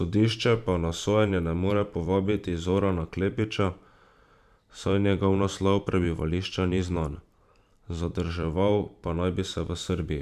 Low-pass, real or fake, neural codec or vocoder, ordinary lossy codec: 14.4 kHz; fake; vocoder, 44.1 kHz, 128 mel bands every 512 samples, BigVGAN v2; none